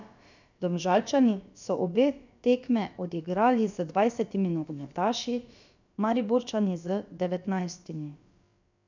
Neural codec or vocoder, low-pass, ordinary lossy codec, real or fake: codec, 16 kHz, about 1 kbps, DyCAST, with the encoder's durations; 7.2 kHz; none; fake